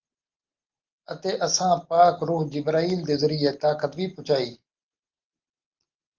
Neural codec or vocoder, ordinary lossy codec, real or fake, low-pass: none; Opus, 32 kbps; real; 7.2 kHz